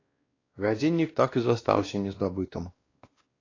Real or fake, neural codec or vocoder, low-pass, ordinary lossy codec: fake; codec, 16 kHz, 1 kbps, X-Codec, WavLM features, trained on Multilingual LibriSpeech; 7.2 kHz; AAC, 32 kbps